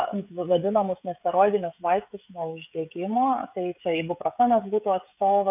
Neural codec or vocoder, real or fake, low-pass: codec, 16 kHz, 16 kbps, FreqCodec, smaller model; fake; 3.6 kHz